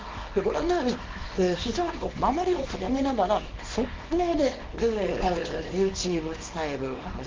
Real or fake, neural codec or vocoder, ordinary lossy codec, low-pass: fake; codec, 24 kHz, 0.9 kbps, WavTokenizer, small release; Opus, 32 kbps; 7.2 kHz